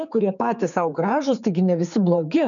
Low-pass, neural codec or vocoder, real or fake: 7.2 kHz; codec, 16 kHz, 4 kbps, X-Codec, HuBERT features, trained on general audio; fake